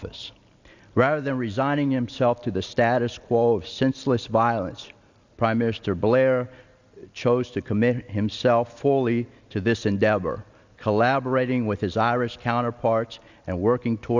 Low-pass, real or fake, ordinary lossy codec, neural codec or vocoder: 7.2 kHz; real; Opus, 64 kbps; none